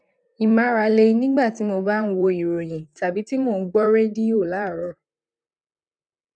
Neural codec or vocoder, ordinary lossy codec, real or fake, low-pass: vocoder, 44.1 kHz, 128 mel bands, Pupu-Vocoder; none; fake; 9.9 kHz